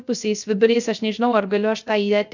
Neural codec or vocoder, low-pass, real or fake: codec, 16 kHz, 0.3 kbps, FocalCodec; 7.2 kHz; fake